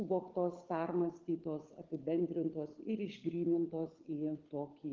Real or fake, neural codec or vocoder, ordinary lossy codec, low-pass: fake; codec, 16 kHz, 16 kbps, FunCodec, trained on LibriTTS, 50 frames a second; Opus, 16 kbps; 7.2 kHz